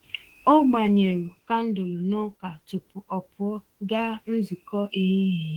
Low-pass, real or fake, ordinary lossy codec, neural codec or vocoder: 19.8 kHz; fake; Opus, 16 kbps; autoencoder, 48 kHz, 32 numbers a frame, DAC-VAE, trained on Japanese speech